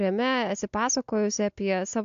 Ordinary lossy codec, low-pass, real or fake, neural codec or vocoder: AAC, 64 kbps; 7.2 kHz; real; none